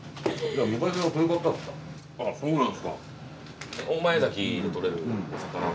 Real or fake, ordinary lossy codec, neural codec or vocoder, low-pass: real; none; none; none